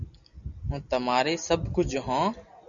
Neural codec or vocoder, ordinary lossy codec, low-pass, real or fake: none; Opus, 64 kbps; 7.2 kHz; real